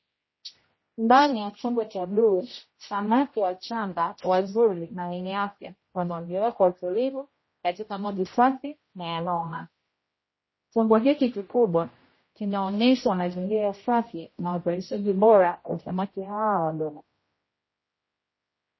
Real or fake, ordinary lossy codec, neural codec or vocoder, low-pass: fake; MP3, 24 kbps; codec, 16 kHz, 0.5 kbps, X-Codec, HuBERT features, trained on general audio; 7.2 kHz